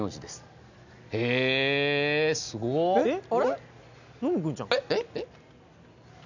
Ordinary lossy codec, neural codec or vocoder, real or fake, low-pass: none; none; real; 7.2 kHz